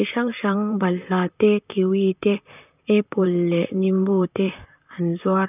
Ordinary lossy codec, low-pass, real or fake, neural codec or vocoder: none; 3.6 kHz; fake; vocoder, 44.1 kHz, 128 mel bands, Pupu-Vocoder